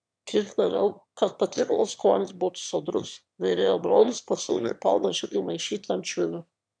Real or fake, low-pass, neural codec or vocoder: fake; 9.9 kHz; autoencoder, 22.05 kHz, a latent of 192 numbers a frame, VITS, trained on one speaker